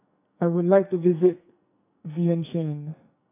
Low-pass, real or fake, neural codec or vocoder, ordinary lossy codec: 3.6 kHz; fake; codec, 44.1 kHz, 2.6 kbps, SNAC; none